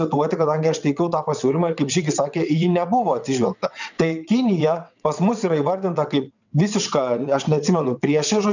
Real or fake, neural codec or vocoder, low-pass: fake; vocoder, 22.05 kHz, 80 mel bands, WaveNeXt; 7.2 kHz